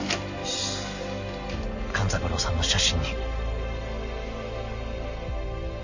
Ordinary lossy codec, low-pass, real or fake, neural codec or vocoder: AAC, 48 kbps; 7.2 kHz; real; none